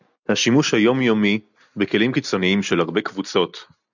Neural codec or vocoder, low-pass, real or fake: none; 7.2 kHz; real